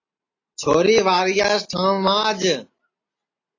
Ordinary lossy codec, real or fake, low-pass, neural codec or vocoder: AAC, 32 kbps; real; 7.2 kHz; none